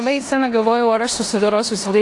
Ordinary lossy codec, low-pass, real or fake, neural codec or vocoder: AAC, 48 kbps; 10.8 kHz; fake; codec, 16 kHz in and 24 kHz out, 0.9 kbps, LongCat-Audio-Codec, four codebook decoder